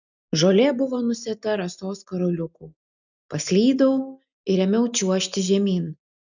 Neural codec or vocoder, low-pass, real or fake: none; 7.2 kHz; real